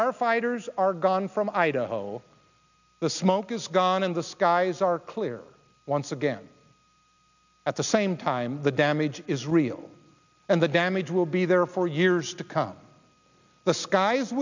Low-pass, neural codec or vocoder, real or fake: 7.2 kHz; none; real